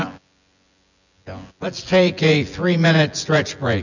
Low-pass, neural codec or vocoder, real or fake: 7.2 kHz; vocoder, 24 kHz, 100 mel bands, Vocos; fake